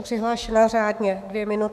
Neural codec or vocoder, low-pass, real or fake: autoencoder, 48 kHz, 128 numbers a frame, DAC-VAE, trained on Japanese speech; 14.4 kHz; fake